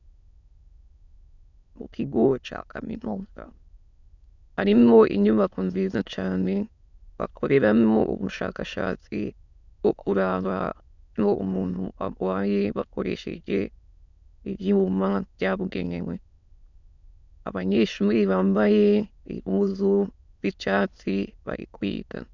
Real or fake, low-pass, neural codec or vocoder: fake; 7.2 kHz; autoencoder, 22.05 kHz, a latent of 192 numbers a frame, VITS, trained on many speakers